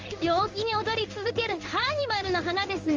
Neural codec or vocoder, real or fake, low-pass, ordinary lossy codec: codec, 16 kHz in and 24 kHz out, 1 kbps, XY-Tokenizer; fake; 7.2 kHz; Opus, 32 kbps